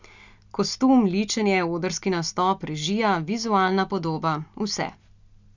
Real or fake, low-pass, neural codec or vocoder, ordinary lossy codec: real; 7.2 kHz; none; none